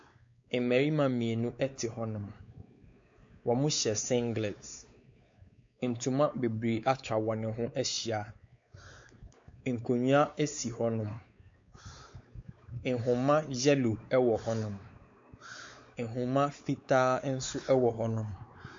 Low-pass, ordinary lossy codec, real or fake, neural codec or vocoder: 7.2 kHz; MP3, 48 kbps; fake; codec, 16 kHz, 4 kbps, X-Codec, WavLM features, trained on Multilingual LibriSpeech